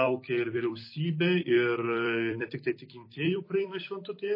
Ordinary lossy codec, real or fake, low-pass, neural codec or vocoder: MP3, 24 kbps; fake; 5.4 kHz; vocoder, 44.1 kHz, 128 mel bands every 256 samples, BigVGAN v2